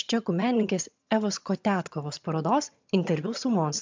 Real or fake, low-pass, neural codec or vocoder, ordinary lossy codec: fake; 7.2 kHz; vocoder, 22.05 kHz, 80 mel bands, HiFi-GAN; MP3, 64 kbps